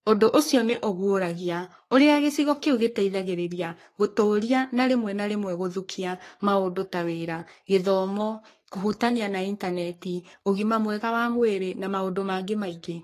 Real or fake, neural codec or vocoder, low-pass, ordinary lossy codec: fake; codec, 44.1 kHz, 3.4 kbps, Pupu-Codec; 14.4 kHz; AAC, 48 kbps